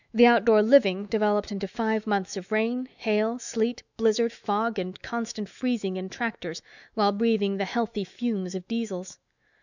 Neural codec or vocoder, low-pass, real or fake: autoencoder, 48 kHz, 128 numbers a frame, DAC-VAE, trained on Japanese speech; 7.2 kHz; fake